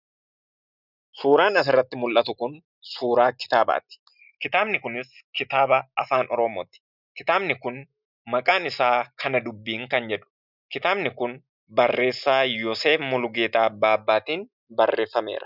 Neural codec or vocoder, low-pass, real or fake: none; 5.4 kHz; real